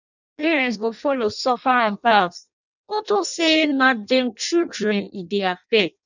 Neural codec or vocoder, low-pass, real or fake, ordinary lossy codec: codec, 16 kHz in and 24 kHz out, 0.6 kbps, FireRedTTS-2 codec; 7.2 kHz; fake; none